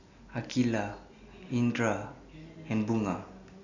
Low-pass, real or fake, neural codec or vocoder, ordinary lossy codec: 7.2 kHz; real; none; none